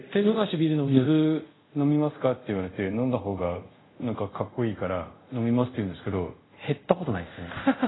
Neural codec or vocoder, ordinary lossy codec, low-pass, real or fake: codec, 24 kHz, 0.5 kbps, DualCodec; AAC, 16 kbps; 7.2 kHz; fake